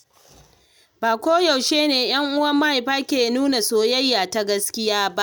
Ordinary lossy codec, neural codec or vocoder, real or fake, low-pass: none; none; real; none